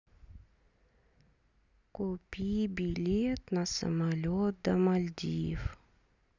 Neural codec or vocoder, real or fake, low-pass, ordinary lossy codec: none; real; 7.2 kHz; none